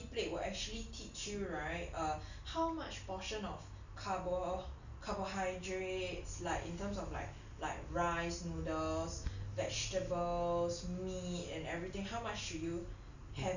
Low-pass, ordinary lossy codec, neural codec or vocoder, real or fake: 7.2 kHz; none; none; real